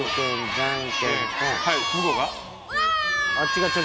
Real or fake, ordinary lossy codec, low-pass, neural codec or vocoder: real; none; none; none